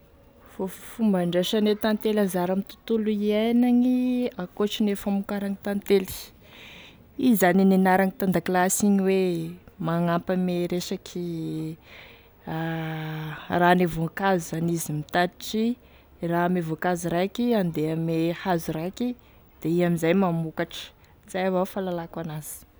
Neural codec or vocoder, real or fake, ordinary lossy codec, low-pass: none; real; none; none